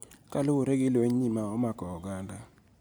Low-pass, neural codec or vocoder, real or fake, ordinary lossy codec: none; none; real; none